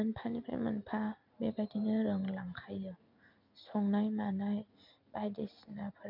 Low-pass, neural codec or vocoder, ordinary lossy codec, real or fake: 5.4 kHz; none; none; real